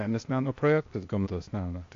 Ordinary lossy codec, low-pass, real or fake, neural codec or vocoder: AAC, 48 kbps; 7.2 kHz; fake; codec, 16 kHz, 0.8 kbps, ZipCodec